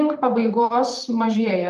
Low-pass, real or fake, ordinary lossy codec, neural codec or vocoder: 14.4 kHz; fake; Opus, 32 kbps; vocoder, 44.1 kHz, 128 mel bands, Pupu-Vocoder